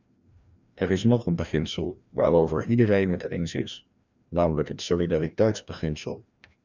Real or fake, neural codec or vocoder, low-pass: fake; codec, 16 kHz, 1 kbps, FreqCodec, larger model; 7.2 kHz